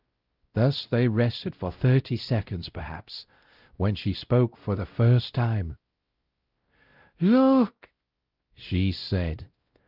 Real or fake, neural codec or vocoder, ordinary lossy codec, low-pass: fake; codec, 16 kHz, 0.5 kbps, X-Codec, WavLM features, trained on Multilingual LibriSpeech; Opus, 24 kbps; 5.4 kHz